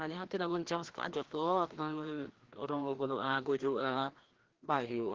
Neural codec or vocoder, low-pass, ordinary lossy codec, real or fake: codec, 16 kHz, 1 kbps, FreqCodec, larger model; 7.2 kHz; Opus, 16 kbps; fake